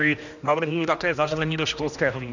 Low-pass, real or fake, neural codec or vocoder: 7.2 kHz; fake; codec, 16 kHz, 1 kbps, X-Codec, HuBERT features, trained on general audio